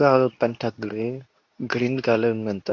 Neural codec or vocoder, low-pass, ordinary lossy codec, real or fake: codec, 24 kHz, 0.9 kbps, WavTokenizer, medium speech release version 2; 7.2 kHz; AAC, 48 kbps; fake